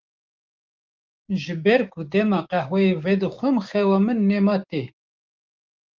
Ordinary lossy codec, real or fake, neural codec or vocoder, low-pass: Opus, 24 kbps; fake; codec, 16 kHz, 6 kbps, DAC; 7.2 kHz